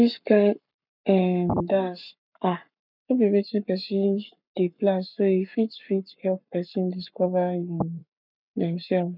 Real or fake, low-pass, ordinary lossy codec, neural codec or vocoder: fake; 5.4 kHz; none; codec, 44.1 kHz, 7.8 kbps, Pupu-Codec